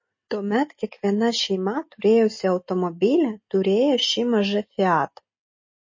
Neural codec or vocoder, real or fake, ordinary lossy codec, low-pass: none; real; MP3, 32 kbps; 7.2 kHz